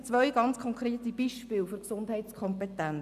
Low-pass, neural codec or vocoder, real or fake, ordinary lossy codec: 14.4 kHz; none; real; none